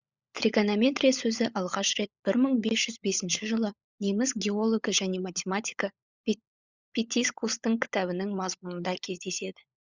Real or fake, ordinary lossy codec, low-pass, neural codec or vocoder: fake; Opus, 64 kbps; 7.2 kHz; codec, 16 kHz, 16 kbps, FunCodec, trained on LibriTTS, 50 frames a second